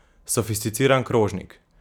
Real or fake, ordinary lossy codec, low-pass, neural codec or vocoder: fake; none; none; vocoder, 44.1 kHz, 128 mel bands every 256 samples, BigVGAN v2